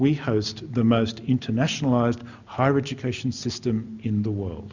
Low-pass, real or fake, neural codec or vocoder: 7.2 kHz; real; none